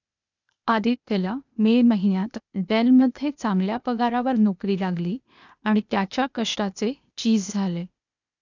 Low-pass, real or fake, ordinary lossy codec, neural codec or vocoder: 7.2 kHz; fake; none; codec, 16 kHz, 0.8 kbps, ZipCodec